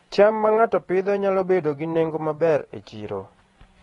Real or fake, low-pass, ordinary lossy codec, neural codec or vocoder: fake; 10.8 kHz; AAC, 32 kbps; vocoder, 24 kHz, 100 mel bands, Vocos